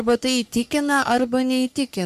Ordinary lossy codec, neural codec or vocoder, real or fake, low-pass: MP3, 96 kbps; codec, 44.1 kHz, 3.4 kbps, Pupu-Codec; fake; 14.4 kHz